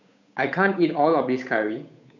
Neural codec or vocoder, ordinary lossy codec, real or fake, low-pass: codec, 16 kHz, 8 kbps, FunCodec, trained on Chinese and English, 25 frames a second; none; fake; 7.2 kHz